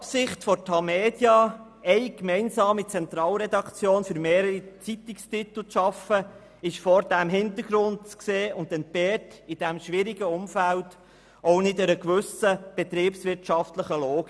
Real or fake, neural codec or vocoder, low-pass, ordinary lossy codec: real; none; none; none